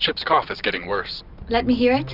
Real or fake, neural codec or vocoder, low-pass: real; none; 5.4 kHz